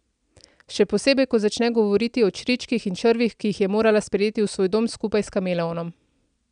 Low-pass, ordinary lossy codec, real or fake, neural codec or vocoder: 9.9 kHz; none; real; none